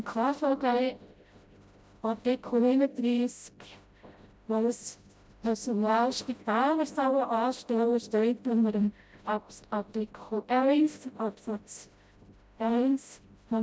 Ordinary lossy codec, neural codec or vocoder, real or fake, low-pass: none; codec, 16 kHz, 0.5 kbps, FreqCodec, smaller model; fake; none